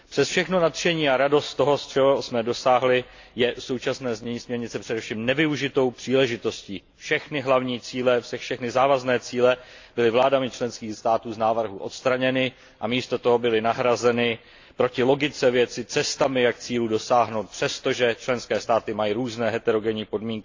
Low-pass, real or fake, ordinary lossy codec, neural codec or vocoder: 7.2 kHz; real; AAC, 48 kbps; none